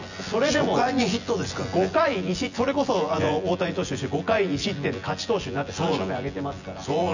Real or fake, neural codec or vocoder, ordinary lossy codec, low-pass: fake; vocoder, 24 kHz, 100 mel bands, Vocos; none; 7.2 kHz